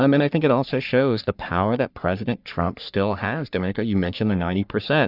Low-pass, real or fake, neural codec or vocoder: 5.4 kHz; fake; codec, 44.1 kHz, 3.4 kbps, Pupu-Codec